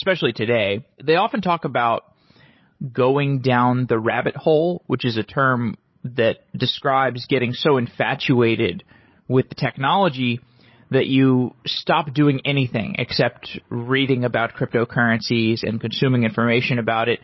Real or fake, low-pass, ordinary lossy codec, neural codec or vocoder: fake; 7.2 kHz; MP3, 24 kbps; codec, 16 kHz, 16 kbps, FreqCodec, larger model